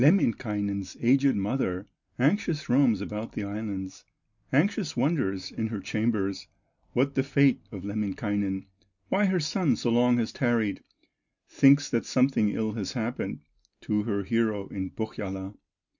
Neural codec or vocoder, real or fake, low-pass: none; real; 7.2 kHz